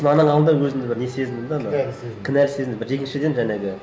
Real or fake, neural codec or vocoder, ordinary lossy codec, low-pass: real; none; none; none